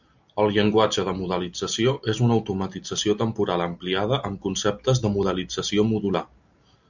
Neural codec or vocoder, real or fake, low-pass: none; real; 7.2 kHz